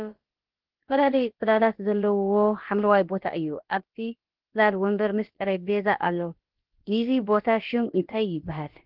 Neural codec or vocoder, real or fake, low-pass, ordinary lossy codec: codec, 16 kHz, about 1 kbps, DyCAST, with the encoder's durations; fake; 5.4 kHz; Opus, 16 kbps